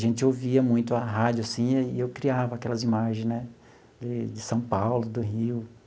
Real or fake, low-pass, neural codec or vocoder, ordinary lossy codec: real; none; none; none